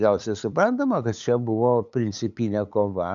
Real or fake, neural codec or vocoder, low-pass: fake; codec, 16 kHz, 8 kbps, FunCodec, trained on LibriTTS, 25 frames a second; 7.2 kHz